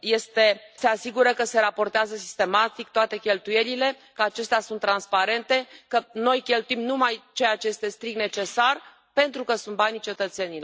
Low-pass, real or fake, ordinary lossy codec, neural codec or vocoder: none; real; none; none